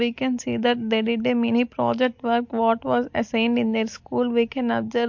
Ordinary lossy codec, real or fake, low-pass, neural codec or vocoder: MP3, 48 kbps; real; 7.2 kHz; none